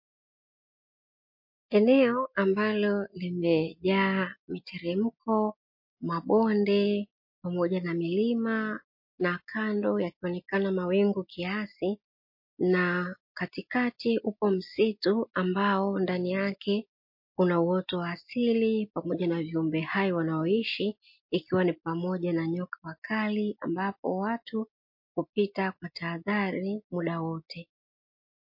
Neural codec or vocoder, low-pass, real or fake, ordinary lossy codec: none; 5.4 kHz; real; MP3, 32 kbps